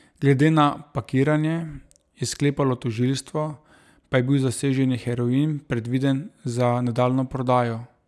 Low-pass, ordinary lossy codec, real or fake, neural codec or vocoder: none; none; real; none